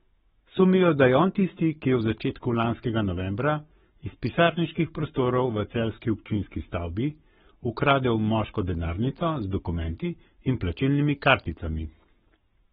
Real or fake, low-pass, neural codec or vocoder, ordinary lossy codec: fake; 19.8 kHz; codec, 44.1 kHz, 7.8 kbps, Pupu-Codec; AAC, 16 kbps